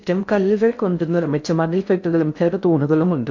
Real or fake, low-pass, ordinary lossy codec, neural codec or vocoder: fake; 7.2 kHz; AAC, 48 kbps; codec, 16 kHz in and 24 kHz out, 0.6 kbps, FocalCodec, streaming, 2048 codes